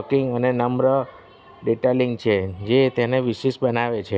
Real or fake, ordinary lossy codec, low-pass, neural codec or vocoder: real; none; none; none